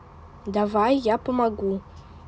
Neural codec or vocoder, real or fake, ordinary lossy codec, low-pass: none; real; none; none